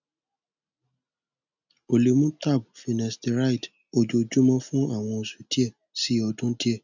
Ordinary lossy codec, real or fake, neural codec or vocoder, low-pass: none; real; none; 7.2 kHz